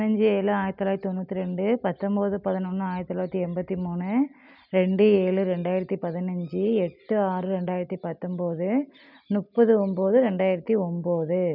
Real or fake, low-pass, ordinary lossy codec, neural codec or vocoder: real; 5.4 kHz; none; none